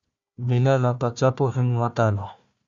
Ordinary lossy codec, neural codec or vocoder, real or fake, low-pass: Opus, 64 kbps; codec, 16 kHz, 1 kbps, FunCodec, trained on Chinese and English, 50 frames a second; fake; 7.2 kHz